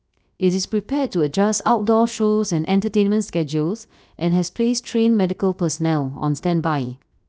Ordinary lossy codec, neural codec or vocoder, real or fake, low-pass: none; codec, 16 kHz, 0.7 kbps, FocalCodec; fake; none